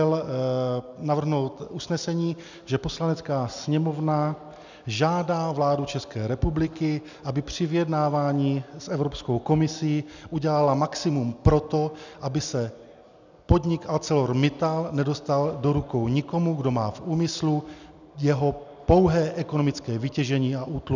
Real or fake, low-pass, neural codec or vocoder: real; 7.2 kHz; none